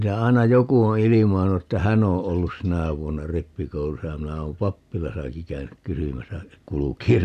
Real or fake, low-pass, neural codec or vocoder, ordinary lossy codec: real; 14.4 kHz; none; none